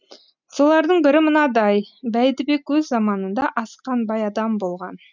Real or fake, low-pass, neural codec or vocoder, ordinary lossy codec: real; 7.2 kHz; none; none